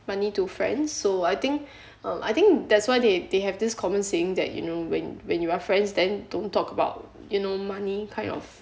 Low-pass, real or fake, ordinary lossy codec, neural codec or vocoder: none; real; none; none